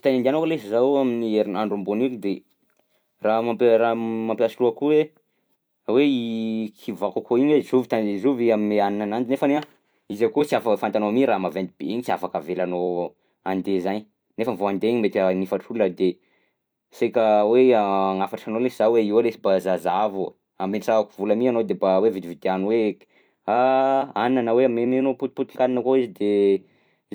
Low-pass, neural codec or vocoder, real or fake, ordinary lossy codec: none; vocoder, 44.1 kHz, 128 mel bands every 256 samples, BigVGAN v2; fake; none